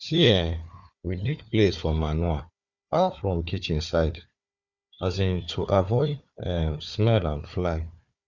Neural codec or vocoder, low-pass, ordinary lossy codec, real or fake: codec, 16 kHz, 4 kbps, FunCodec, trained on Chinese and English, 50 frames a second; 7.2 kHz; Opus, 64 kbps; fake